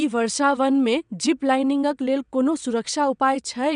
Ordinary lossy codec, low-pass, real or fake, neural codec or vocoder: none; 9.9 kHz; fake; vocoder, 22.05 kHz, 80 mel bands, WaveNeXt